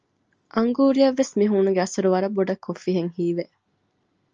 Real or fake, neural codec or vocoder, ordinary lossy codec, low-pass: real; none; Opus, 24 kbps; 7.2 kHz